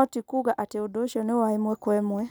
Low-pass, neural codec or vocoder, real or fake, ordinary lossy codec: none; none; real; none